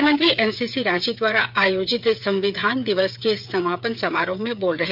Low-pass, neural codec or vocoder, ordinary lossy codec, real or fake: 5.4 kHz; codec, 16 kHz, 8 kbps, FreqCodec, smaller model; none; fake